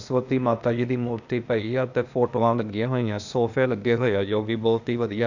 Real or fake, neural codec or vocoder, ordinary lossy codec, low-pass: fake; codec, 16 kHz, 0.8 kbps, ZipCodec; none; 7.2 kHz